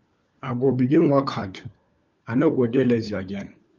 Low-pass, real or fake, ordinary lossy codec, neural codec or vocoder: 7.2 kHz; fake; Opus, 32 kbps; codec, 16 kHz, 4 kbps, FunCodec, trained on LibriTTS, 50 frames a second